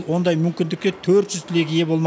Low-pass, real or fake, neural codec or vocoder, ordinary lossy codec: none; real; none; none